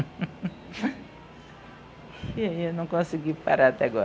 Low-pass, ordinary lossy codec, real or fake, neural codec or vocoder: none; none; real; none